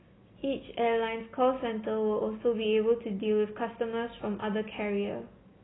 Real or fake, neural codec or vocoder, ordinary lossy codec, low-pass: real; none; AAC, 16 kbps; 7.2 kHz